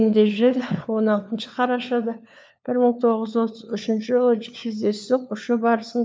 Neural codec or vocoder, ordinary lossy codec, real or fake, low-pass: codec, 16 kHz, 4 kbps, FunCodec, trained on LibriTTS, 50 frames a second; none; fake; none